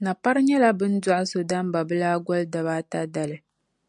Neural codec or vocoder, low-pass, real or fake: none; 10.8 kHz; real